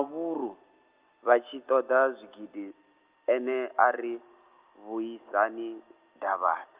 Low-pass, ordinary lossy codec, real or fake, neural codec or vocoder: 3.6 kHz; Opus, 32 kbps; real; none